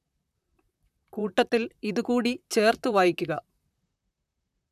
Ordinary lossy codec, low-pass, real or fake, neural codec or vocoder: none; 14.4 kHz; fake; vocoder, 44.1 kHz, 128 mel bands, Pupu-Vocoder